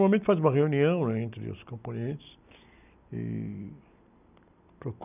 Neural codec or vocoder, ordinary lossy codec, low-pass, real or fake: none; none; 3.6 kHz; real